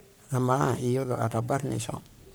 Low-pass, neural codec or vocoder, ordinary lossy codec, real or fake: none; codec, 44.1 kHz, 3.4 kbps, Pupu-Codec; none; fake